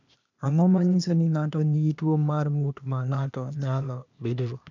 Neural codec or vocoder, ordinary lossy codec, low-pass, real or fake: codec, 16 kHz, 0.8 kbps, ZipCodec; none; 7.2 kHz; fake